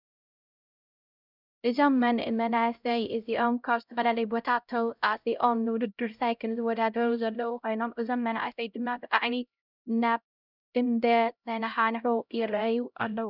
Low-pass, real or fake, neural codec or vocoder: 5.4 kHz; fake; codec, 16 kHz, 0.5 kbps, X-Codec, HuBERT features, trained on LibriSpeech